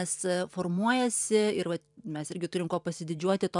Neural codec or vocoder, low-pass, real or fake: vocoder, 44.1 kHz, 128 mel bands every 512 samples, BigVGAN v2; 10.8 kHz; fake